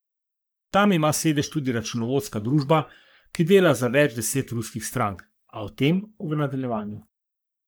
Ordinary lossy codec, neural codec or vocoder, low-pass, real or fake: none; codec, 44.1 kHz, 3.4 kbps, Pupu-Codec; none; fake